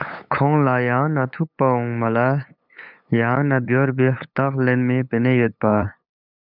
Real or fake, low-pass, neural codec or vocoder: fake; 5.4 kHz; codec, 16 kHz, 8 kbps, FunCodec, trained on LibriTTS, 25 frames a second